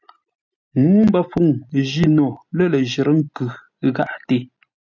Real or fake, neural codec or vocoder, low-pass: real; none; 7.2 kHz